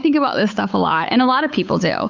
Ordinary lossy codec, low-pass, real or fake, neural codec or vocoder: Opus, 64 kbps; 7.2 kHz; fake; codec, 16 kHz, 8 kbps, FunCodec, trained on Chinese and English, 25 frames a second